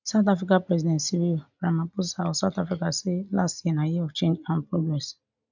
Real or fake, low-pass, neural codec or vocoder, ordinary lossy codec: real; 7.2 kHz; none; none